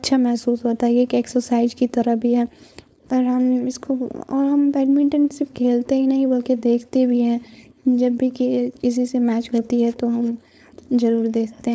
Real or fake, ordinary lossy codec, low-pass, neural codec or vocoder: fake; none; none; codec, 16 kHz, 4.8 kbps, FACodec